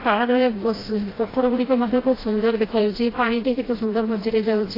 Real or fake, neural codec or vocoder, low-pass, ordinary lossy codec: fake; codec, 16 kHz, 1 kbps, FreqCodec, smaller model; 5.4 kHz; AAC, 24 kbps